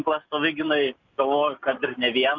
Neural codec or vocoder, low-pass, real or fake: none; 7.2 kHz; real